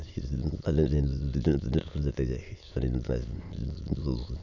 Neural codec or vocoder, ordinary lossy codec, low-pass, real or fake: autoencoder, 22.05 kHz, a latent of 192 numbers a frame, VITS, trained on many speakers; none; 7.2 kHz; fake